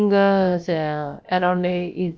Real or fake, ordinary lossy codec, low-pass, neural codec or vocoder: fake; none; none; codec, 16 kHz, about 1 kbps, DyCAST, with the encoder's durations